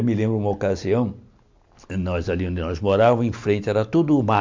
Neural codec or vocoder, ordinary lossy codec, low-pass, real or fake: none; MP3, 64 kbps; 7.2 kHz; real